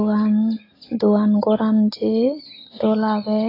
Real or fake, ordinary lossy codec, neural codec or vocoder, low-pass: real; AAC, 24 kbps; none; 5.4 kHz